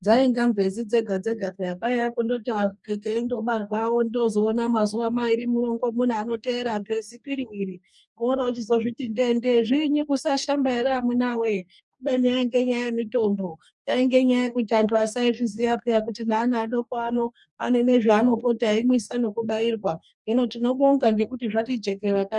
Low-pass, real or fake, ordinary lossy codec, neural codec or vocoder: 10.8 kHz; fake; MP3, 96 kbps; codec, 44.1 kHz, 2.6 kbps, DAC